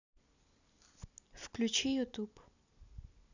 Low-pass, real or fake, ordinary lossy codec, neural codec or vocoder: 7.2 kHz; real; none; none